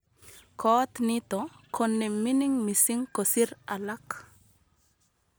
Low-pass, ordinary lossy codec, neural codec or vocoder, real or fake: none; none; none; real